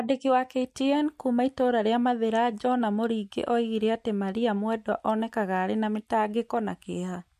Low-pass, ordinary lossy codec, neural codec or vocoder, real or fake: 14.4 kHz; MP3, 64 kbps; none; real